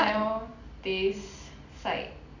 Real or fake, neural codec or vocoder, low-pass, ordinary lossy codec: real; none; 7.2 kHz; none